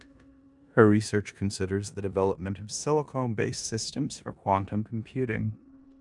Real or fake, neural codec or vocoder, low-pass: fake; codec, 16 kHz in and 24 kHz out, 0.9 kbps, LongCat-Audio-Codec, four codebook decoder; 10.8 kHz